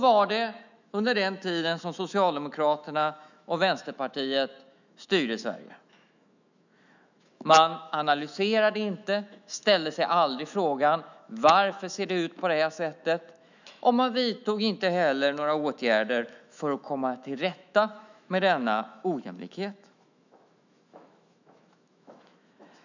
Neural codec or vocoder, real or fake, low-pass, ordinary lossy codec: autoencoder, 48 kHz, 128 numbers a frame, DAC-VAE, trained on Japanese speech; fake; 7.2 kHz; none